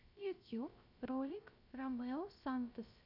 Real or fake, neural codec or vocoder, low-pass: fake; codec, 16 kHz, 0.3 kbps, FocalCodec; 5.4 kHz